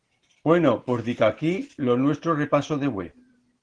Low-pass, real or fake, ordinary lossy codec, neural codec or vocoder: 9.9 kHz; fake; Opus, 16 kbps; vocoder, 44.1 kHz, 128 mel bands every 512 samples, BigVGAN v2